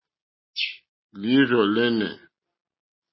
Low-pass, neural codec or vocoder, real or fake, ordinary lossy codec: 7.2 kHz; none; real; MP3, 24 kbps